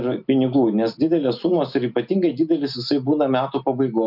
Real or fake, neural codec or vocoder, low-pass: real; none; 5.4 kHz